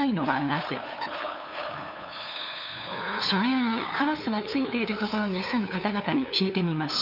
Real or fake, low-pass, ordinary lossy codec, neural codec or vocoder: fake; 5.4 kHz; none; codec, 16 kHz, 2 kbps, FunCodec, trained on LibriTTS, 25 frames a second